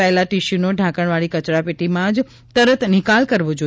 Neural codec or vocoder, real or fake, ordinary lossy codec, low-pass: none; real; none; none